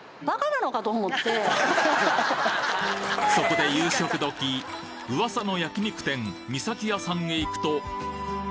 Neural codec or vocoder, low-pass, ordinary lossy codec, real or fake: none; none; none; real